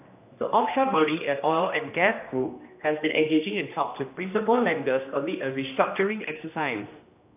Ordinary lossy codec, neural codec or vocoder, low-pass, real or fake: AAC, 32 kbps; codec, 16 kHz, 1 kbps, X-Codec, HuBERT features, trained on general audio; 3.6 kHz; fake